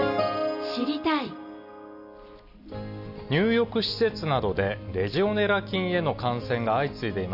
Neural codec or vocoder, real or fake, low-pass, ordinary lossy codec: none; real; 5.4 kHz; none